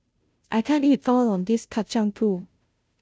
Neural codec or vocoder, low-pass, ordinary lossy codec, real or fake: codec, 16 kHz, 0.5 kbps, FunCodec, trained on Chinese and English, 25 frames a second; none; none; fake